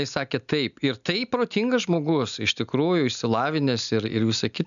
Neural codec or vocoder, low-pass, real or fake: none; 7.2 kHz; real